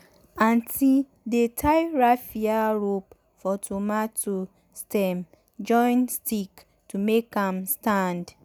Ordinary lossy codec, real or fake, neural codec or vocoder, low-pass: none; real; none; none